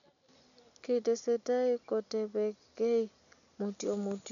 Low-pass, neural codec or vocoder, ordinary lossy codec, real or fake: 7.2 kHz; none; none; real